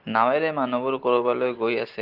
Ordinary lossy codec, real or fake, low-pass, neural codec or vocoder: Opus, 16 kbps; real; 5.4 kHz; none